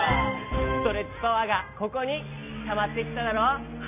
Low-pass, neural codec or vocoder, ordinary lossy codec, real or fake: 3.6 kHz; none; none; real